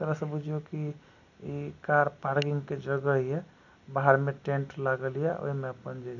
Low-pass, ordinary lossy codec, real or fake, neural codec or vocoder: 7.2 kHz; none; real; none